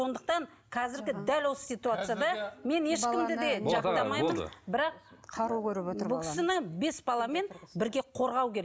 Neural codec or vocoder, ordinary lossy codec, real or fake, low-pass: none; none; real; none